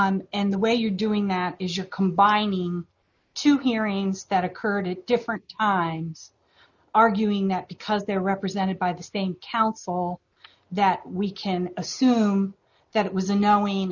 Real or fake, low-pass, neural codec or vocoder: real; 7.2 kHz; none